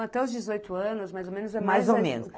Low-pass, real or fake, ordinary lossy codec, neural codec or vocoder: none; real; none; none